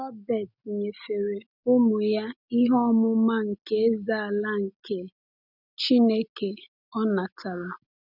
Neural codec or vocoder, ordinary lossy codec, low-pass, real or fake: none; none; 5.4 kHz; real